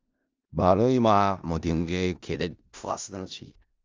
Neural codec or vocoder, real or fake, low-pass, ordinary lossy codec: codec, 16 kHz in and 24 kHz out, 0.4 kbps, LongCat-Audio-Codec, four codebook decoder; fake; 7.2 kHz; Opus, 32 kbps